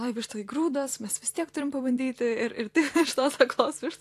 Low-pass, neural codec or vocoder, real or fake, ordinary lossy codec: 14.4 kHz; vocoder, 44.1 kHz, 128 mel bands every 256 samples, BigVGAN v2; fake; AAC, 64 kbps